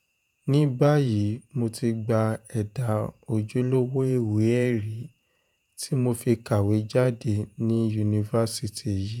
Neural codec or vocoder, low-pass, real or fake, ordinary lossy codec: vocoder, 48 kHz, 128 mel bands, Vocos; 19.8 kHz; fake; none